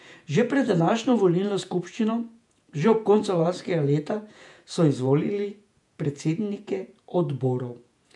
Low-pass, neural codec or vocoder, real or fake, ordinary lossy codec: 10.8 kHz; vocoder, 48 kHz, 128 mel bands, Vocos; fake; none